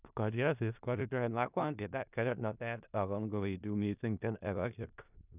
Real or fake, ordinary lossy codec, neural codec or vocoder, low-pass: fake; none; codec, 16 kHz in and 24 kHz out, 0.4 kbps, LongCat-Audio-Codec, four codebook decoder; 3.6 kHz